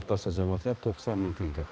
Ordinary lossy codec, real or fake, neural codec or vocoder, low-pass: none; fake; codec, 16 kHz, 1 kbps, X-Codec, HuBERT features, trained on balanced general audio; none